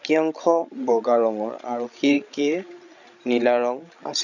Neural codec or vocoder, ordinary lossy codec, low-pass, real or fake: codec, 16 kHz, 16 kbps, FreqCodec, larger model; none; 7.2 kHz; fake